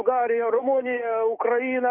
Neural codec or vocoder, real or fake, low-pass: codec, 44.1 kHz, 7.8 kbps, DAC; fake; 3.6 kHz